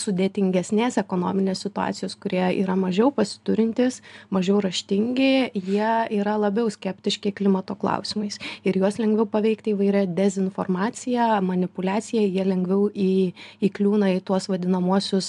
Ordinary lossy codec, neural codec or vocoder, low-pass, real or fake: AAC, 96 kbps; none; 10.8 kHz; real